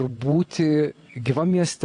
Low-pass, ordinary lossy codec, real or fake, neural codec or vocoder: 9.9 kHz; AAC, 48 kbps; fake; vocoder, 22.05 kHz, 80 mel bands, WaveNeXt